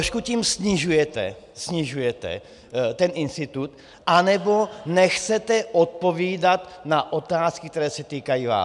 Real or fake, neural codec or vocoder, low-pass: real; none; 10.8 kHz